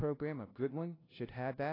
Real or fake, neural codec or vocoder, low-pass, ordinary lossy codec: fake; codec, 16 kHz, 0.5 kbps, FunCodec, trained on LibriTTS, 25 frames a second; 5.4 kHz; AAC, 24 kbps